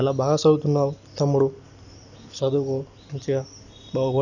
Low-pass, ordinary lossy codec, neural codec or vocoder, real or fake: 7.2 kHz; none; none; real